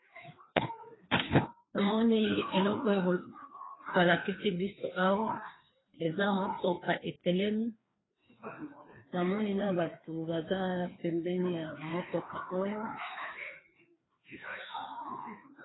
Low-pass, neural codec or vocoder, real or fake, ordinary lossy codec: 7.2 kHz; codec, 16 kHz, 2 kbps, FreqCodec, larger model; fake; AAC, 16 kbps